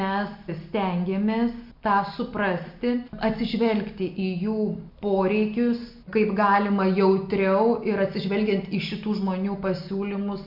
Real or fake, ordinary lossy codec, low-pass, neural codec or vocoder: real; MP3, 48 kbps; 5.4 kHz; none